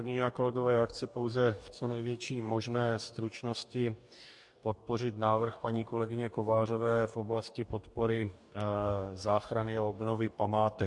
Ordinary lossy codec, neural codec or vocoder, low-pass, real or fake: MP3, 64 kbps; codec, 44.1 kHz, 2.6 kbps, DAC; 10.8 kHz; fake